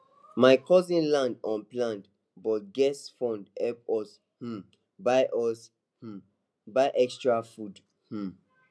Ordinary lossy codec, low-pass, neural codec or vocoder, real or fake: none; none; none; real